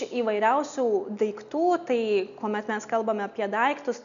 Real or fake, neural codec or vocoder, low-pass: real; none; 7.2 kHz